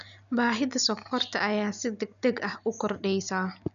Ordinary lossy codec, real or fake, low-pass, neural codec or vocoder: none; real; 7.2 kHz; none